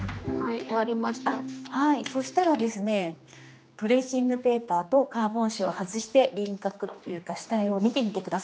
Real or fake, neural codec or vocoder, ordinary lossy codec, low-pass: fake; codec, 16 kHz, 2 kbps, X-Codec, HuBERT features, trained on general audio; none; none